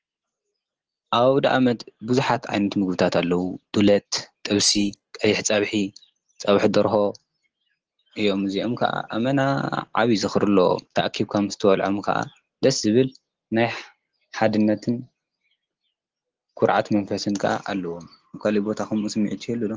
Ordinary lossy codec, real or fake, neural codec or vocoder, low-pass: Opus, 16 kbps; real; none; 7.2 kHz